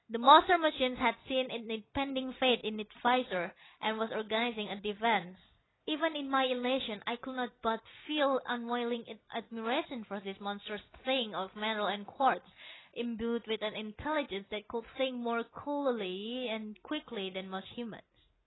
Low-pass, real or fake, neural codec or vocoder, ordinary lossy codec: 7.2 kHz; real; none; AAC, 16 kbps